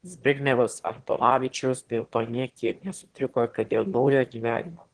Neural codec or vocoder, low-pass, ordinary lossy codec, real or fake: autoencoder, 22.05 kHz, a latent of 192 numbers a frame, VITS, trained on one speaker; 9.9 kHz; Opus, 16 kbps; fake